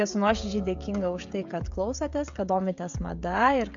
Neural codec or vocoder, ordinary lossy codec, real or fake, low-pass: codec, 16 kHz, 16 kbps, FreqCodec, smaller model; MP3, 64 kbps; fake; 7.2 kHz